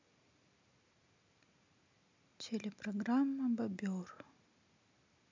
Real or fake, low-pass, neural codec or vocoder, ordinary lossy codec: real; 7.2 kHz; none; MP3, 64 kbps